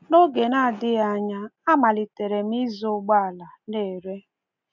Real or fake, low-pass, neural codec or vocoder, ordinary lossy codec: real; 7.2 kHz; none; none